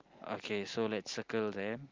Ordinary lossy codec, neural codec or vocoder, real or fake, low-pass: Opus, 32 kbps; none; real; 7.2 kHz